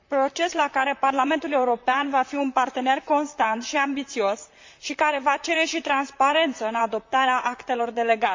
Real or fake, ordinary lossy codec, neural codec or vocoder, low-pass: fake; MP3, 64 kbps; vocoder, 44.1 kHz, 128 mel bands, Pupu-Vocoder; 7.2 kHz